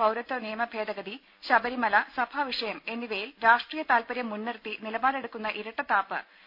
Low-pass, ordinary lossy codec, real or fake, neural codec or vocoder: 5.4 kHz; none; real; none